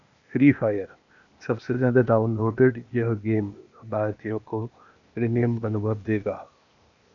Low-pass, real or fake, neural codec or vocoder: 7.2 kHz; fake; codec, 16 kHz, 0.8 kbps, ZipCodec